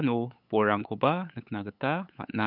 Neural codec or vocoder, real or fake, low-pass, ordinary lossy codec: codec, 16 kHz, 8 kbps, FunCodec, trained on LibriTTS, 25 frames a second; fake; 5.4 kHz; none